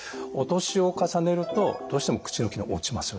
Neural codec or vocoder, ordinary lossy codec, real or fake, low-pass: none; none; real; none